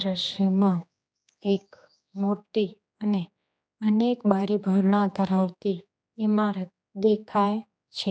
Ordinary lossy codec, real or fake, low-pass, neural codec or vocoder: none; fake; none; codec, 16 kHz, 2 kbps, X-Codec, HuBERT features, trained on general audio